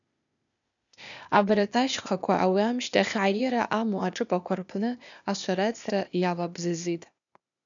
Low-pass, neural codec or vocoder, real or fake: 7.2 kHz; codec, 16 kHz, 0.8 kbps, ZipCodec; fake